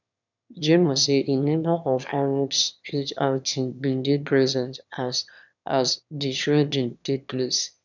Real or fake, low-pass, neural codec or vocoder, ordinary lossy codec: fake; 7.2 kHz; autoencoder, 22.05 kHz, a latent of 192 numbers a frame, VITS, trained on one speaker; none